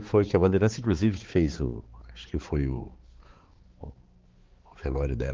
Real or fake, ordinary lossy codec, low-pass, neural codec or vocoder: fake; Opus, 16 kbps; 7.2 kHz; codec, 16 kHz, 4 kbps, X-Codec, HuBERT features, trained on balanced general audio